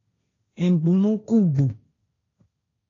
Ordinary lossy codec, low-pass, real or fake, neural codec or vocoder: AAC, 32 kbps; 7.2 kHz; fake; codec, 16 kHz, 1.1 kbps, Voila-Tokenizer